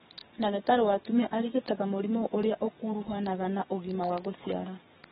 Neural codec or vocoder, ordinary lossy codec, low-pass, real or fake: codec, 44.1 kHz, 7.8 kbps, DAC; AAC, 16 kbps; 19.8 kHz; fake